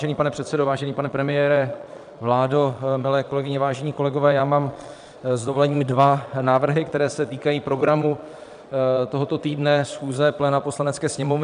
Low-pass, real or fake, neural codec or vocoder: 9.9 kHz; fake; vocoder, 22.05 kHz, 80 mel bands, Vocos